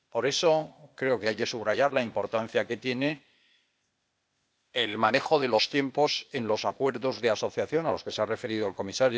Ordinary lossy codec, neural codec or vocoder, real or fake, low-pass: none; codec, 16 kHz, 0.8 kbps, ZipCodec; fake; none